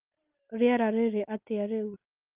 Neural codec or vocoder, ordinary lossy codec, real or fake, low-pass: none; Opus, 24 kbps; real; 3.6 kHz